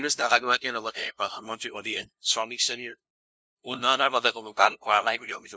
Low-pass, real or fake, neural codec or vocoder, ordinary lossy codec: none; fake; codec, 16 kHz, 0.5 kbps, FunCodec, trained on LibriTTS, 25 frames a second; none